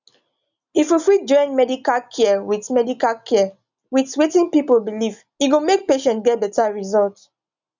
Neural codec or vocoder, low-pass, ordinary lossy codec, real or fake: none; 7.2 kHz; none; real